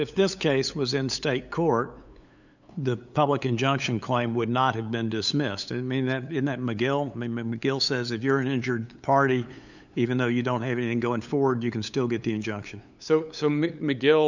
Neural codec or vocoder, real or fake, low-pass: codec, 16 kHz, 8 kbps, FunCodec, trained on LibriTTS, 25 frames a second; fake; 7.2 kHz